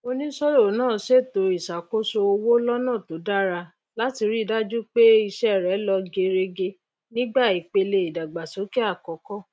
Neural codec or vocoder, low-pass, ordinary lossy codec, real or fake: none; none; none; real